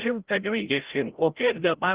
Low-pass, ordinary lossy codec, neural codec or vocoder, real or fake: 3.6 kHz; Opus, 16 kbps; codec, 16 kHz, 0.5 kbps, FreqCodec, larger model; fake